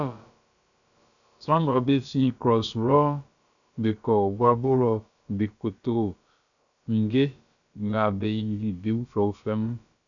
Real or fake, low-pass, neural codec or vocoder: fake; 7.2 kHz; codec, 16 kHz, about 1 kbps, DyCAST, with the encoder's durations